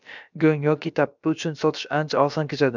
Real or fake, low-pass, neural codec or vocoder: fake; 7.2 kHz; codec, 16 kHz, about 1 kbps, DyCAST, with the encoder's durations